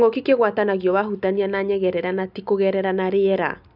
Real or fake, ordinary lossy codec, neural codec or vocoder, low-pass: real; none; none; 5.4 kHz